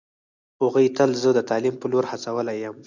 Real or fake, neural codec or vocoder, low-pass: real; none; 7.2 kHz